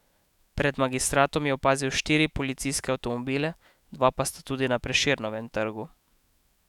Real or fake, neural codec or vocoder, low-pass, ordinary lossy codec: fake; autoencoder, 48 kHz, 128 numbers a frame, DAC-VAE, trained on Japanese speech; 19.8 kHz; none